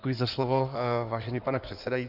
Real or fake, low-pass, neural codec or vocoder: fake; 5.4 kHz; codec, 16 kHz in and 24 kHz out, 2.2 kbps, FireRedTTS-2 codec